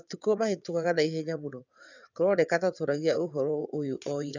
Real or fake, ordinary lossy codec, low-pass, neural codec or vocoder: fake; none; 7.2 kHz; vocoder, 22.05 kHz, 80 mel bands, WaveNeXt